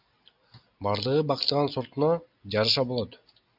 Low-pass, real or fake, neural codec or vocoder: 5.4 kHz; real; none